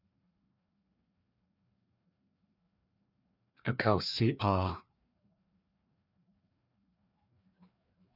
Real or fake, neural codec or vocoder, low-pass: fake; codec, 16 kHz, 2 kbps, FreqCodec, larger model; 5.4 kHz